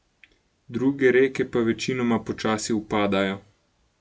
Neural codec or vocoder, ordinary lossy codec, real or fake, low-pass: none; none; real; none